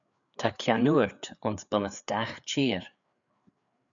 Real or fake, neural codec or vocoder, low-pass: fake; codec, 16 kHz, 4 kbps, FreqCodec, larger model; 7.2 kHz